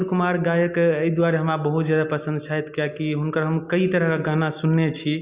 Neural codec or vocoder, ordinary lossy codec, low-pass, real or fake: none; Opus, 64 kbps; 3.6 kHz; real